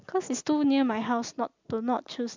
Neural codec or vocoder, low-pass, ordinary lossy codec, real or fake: none; 7.2 kHz; MP3, 64 kbps; real